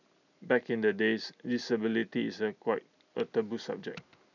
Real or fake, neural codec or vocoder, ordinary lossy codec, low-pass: real; none; none; 7.2 kHz